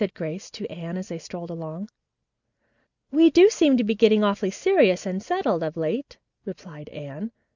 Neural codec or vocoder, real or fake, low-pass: none; real; 7.2 kHz